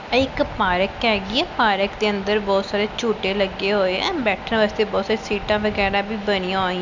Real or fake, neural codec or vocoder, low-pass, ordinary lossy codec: real; none; 7.2 kHz; none